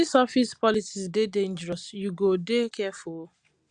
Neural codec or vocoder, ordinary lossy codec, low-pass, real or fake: none; Opus, 64 kbps; 9.9 kHz; real